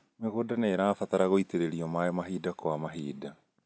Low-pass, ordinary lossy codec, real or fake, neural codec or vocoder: none; none; real; none